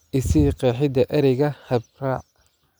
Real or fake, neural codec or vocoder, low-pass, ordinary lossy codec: real; none; none; none